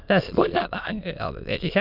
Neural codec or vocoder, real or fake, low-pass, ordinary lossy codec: autoencoder, 22.05 kHz, a latent of 192 numbers a frame, VITS, trained on many speakers; fake; 5.4 kHz; none